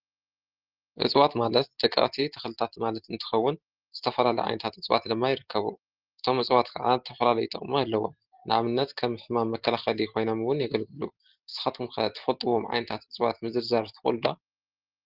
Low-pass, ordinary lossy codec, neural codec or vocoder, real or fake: 5.4 kHz; Opus, 16 kbps; none; real